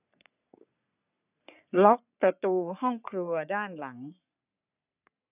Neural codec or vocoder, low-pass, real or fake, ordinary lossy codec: codec, 44.1 kHz, 3.4 kbps, Pupu-Codec; 3.6 kHz; fake; none